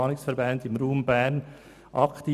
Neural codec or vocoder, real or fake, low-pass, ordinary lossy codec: none; real; 14.4 kHz; none